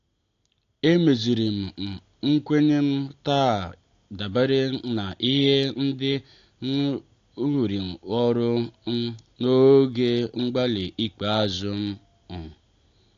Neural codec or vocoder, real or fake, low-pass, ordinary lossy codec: none; real; 7.2 kHz; AAC, 48 kbps